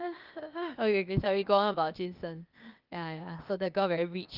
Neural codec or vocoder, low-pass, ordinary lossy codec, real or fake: codec, 16 kHz, 0.8 kbps, ZipCodec; 5.4 kHz; Opus, 24 kbps; fake